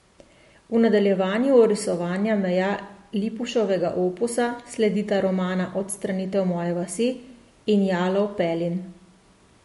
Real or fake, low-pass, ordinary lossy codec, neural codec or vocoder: real; 14.4 kHz; MP3, 48 kbps; none